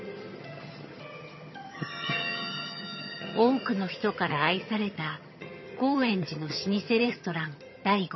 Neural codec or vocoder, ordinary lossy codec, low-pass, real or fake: vocoder, 22.05 kHz, 80 mel bands, HiFi-GAN; MP3, 24 kbps; 7.2 kHz; fake